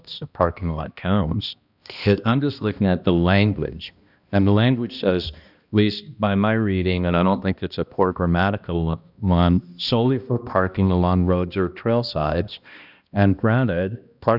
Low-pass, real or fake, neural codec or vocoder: 5.4 kHz; fake; codec, 16 kHz, 1 kbps, X-Codec, HuBERT features, trained on balanced general audio